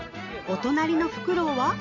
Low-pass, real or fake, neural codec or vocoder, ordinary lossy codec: 7.2 kHz; real; none; none